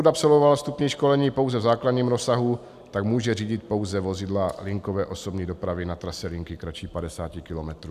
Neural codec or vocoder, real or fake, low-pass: none; real; 14.4 kHz